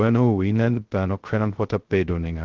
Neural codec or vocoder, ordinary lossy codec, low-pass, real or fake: codec, 16 kHz, 0.2 kbps, FocalCodec; Opus, 16 kbps; 7.2 kHz; fake